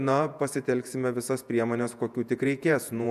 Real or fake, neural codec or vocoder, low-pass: fake; vocoder, 48 kHz, 128 mel bands, Vocos; 14.4 kHz